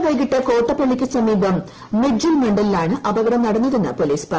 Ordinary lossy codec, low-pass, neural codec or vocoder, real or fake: Opus, 16 kbps; 7.2 kHz; none; real